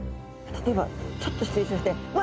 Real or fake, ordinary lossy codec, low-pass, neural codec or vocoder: real; none; none; none